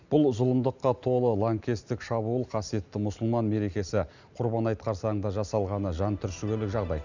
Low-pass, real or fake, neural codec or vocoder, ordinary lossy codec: 7.2 kHz; real; none; none